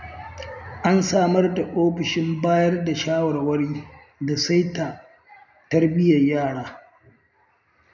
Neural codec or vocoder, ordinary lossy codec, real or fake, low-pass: none; none; real; 7.2 kHz